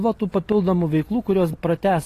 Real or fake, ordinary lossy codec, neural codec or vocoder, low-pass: real; AAC, 48 kbps; none; 14.4 kHz